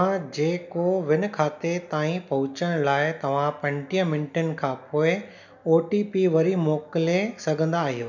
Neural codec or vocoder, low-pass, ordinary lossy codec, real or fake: none; 7.2 kHz; none; real